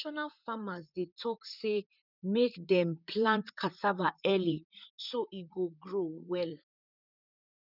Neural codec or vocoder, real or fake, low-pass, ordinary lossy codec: vocoder, 44.1 kHz, 128 mel bands, Pupu-Vocoder; fake; 5.4 kHz; none